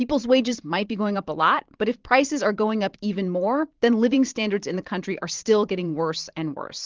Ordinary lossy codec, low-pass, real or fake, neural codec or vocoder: Opus, 16 kbps; 7.2 kHz; fake; vocoder, 44.1 kHz, 128 mel bands every 512 samples, BigVGAN v2